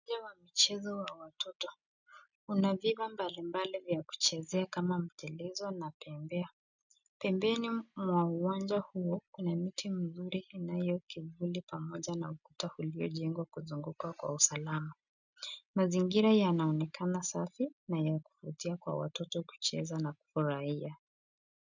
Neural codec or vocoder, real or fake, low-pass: none; real; 7.2 kHz